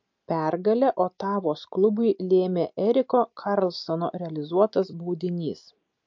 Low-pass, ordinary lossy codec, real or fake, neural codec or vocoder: 7.2 kHz; MP3, 48 kbps; real; none